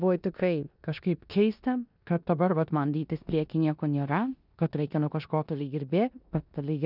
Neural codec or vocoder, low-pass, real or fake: codec, 16 kHz in and 24 kHz out, 0.9 kbps, LongCat-Audio-Codec, four codebook decoder; 5.4 kHz; fake